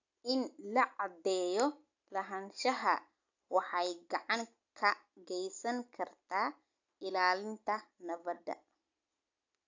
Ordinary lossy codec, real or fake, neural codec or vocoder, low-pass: AAC, 48 kbps; real; none; 7.2 kHz